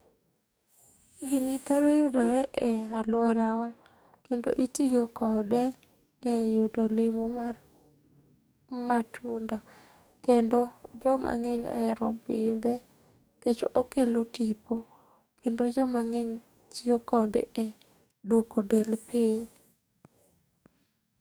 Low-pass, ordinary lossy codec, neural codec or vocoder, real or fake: none; none; codec, 44.1 kHz, 2.6 kbps, DAC; fake